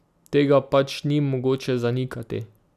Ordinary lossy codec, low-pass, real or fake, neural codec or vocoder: none; 14.4 kHz; real; none